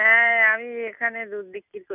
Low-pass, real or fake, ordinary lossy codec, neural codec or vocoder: 3.6 kHz; real; none; none